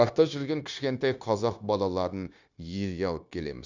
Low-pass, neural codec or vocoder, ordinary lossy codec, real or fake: 7.2 kHz; codec, 16 kHz, 0.9 kbps, LongCat-Audio-Codec; none; fake